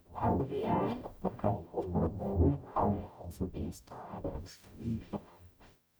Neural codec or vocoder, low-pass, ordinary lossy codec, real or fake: codec, 44.1 kHz, 0.9 kbps, DAC; none; none; fake